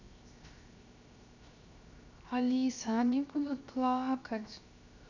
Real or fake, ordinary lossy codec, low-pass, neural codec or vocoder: fake; none; 7.2 kHz; codec, 16 kHz, 0.7 kbps, FocalCodec